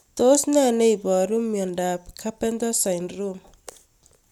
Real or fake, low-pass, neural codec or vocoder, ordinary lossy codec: real; 19.8 kHz; none; none